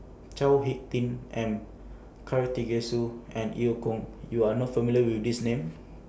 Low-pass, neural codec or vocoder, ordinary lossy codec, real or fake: none; none; none; real